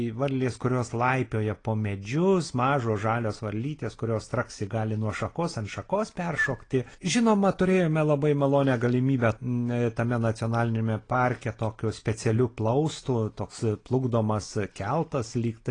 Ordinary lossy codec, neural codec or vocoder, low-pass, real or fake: AAC, 32 kbps; none; 9.9 kHz; real